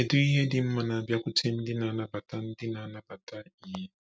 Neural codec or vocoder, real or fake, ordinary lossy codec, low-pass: none; real; none; none